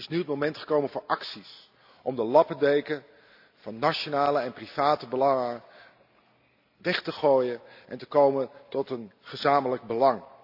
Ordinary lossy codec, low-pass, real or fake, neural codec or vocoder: AAC, 48 kbps; 5.4 kHz; real; none